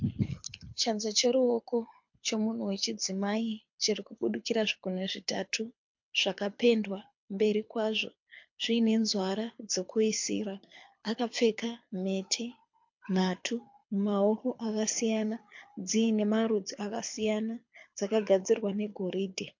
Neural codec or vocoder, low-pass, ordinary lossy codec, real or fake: codec, 24 kHz, 6 kbps, HILCodec; 7.2 kHz; MP3, 48 kbps; fake